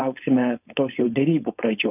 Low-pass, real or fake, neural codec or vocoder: 3.6 kHz; fake; codec, 16 kHz, 4.8 kbps, FACodec